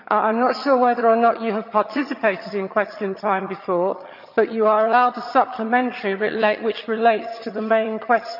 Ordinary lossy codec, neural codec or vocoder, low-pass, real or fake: none; vocoder, 22.05 kHz, 80 mel bands, HiFi-GAN; 5.4 kHz; fake